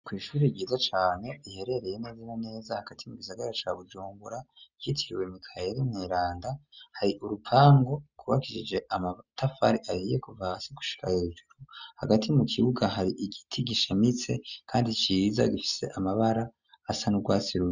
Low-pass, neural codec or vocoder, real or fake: 7.2 kHz; none; real